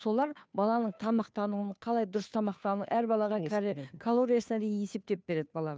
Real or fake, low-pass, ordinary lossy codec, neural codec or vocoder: fake; none; none; codec, 16 kHz, 2 kbps, FunCodec, trained on Chinese and English, 25 frames a second